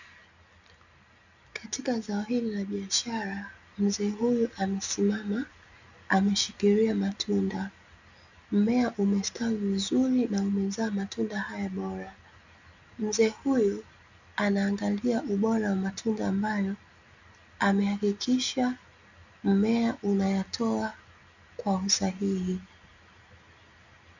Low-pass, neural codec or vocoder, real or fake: 7.2 kHz; none; real